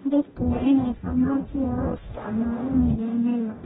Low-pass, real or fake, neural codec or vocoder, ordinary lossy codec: 19.8 kHz; fake; codec, 44.1 kHz, 0.9 kbps, DAC; AAC, 16 kbps